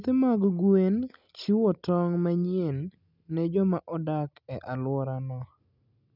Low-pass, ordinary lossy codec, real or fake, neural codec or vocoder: 5.4 kHz; none; real; none